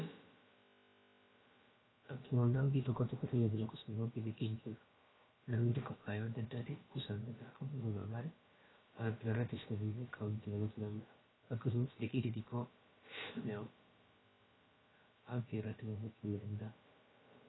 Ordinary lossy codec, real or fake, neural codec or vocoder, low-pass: AAC, 16 kbps; fake; codec, 16 kHz, about 1 kbps, DyCAST, with the encoder's durations; 7.2 kHz